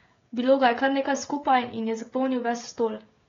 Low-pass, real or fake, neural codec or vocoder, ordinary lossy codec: 7.2 kHz; fake; codec, 16 kHz, 16 kbps, FreqCodec, smaller model; AAC, 32 kbps